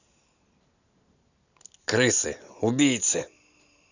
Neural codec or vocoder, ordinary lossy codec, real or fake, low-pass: none; none; real; 7.2 kHz